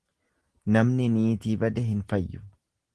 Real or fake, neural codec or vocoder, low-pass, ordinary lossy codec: real; none; 10.8 kHz; Opus, 16 kbps